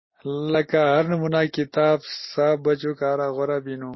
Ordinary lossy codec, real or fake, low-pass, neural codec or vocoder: MP3, 24 kbps; real; 7.2 kHz; none